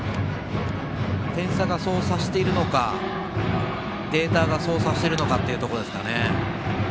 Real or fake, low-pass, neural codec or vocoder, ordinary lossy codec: real; none; none; none